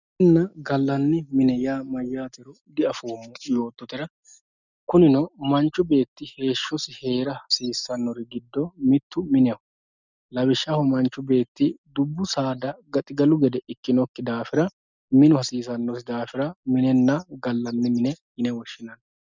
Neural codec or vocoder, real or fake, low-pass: none; real; 7.2 kHz